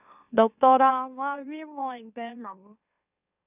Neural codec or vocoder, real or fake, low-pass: autoencoder, 44.1 kHz, a latent of 192 numbers a frame, MeloTTS; fake; 3.6 kHz